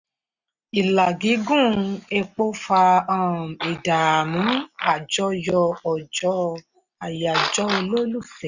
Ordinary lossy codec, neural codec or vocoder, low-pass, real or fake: none; none; 7.2 kHz; real